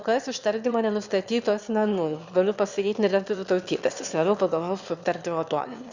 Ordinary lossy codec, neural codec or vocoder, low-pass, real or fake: Opus, 64 kbps; autoencoder, 22.05 kHz, a latent of 192 numbers a frame, VITS, trained on one speaker; 7.2 kHz; fake